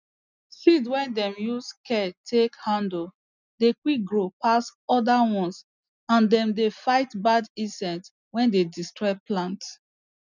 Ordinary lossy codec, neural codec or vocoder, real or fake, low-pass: none; none; real; 7.2 kHz